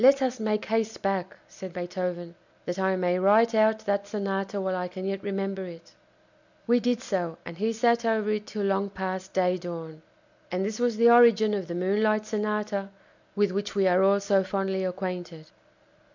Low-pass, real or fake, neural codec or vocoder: 7.2 kHz; real; none